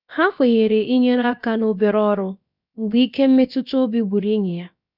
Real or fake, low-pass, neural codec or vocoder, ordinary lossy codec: fake; 5.4 kHz; codec, 16 kHz, about 1 kbps, DyCAST, with the encoder's durations; none